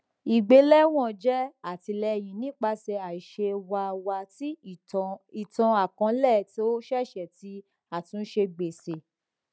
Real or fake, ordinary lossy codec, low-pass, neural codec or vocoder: real; none; none; none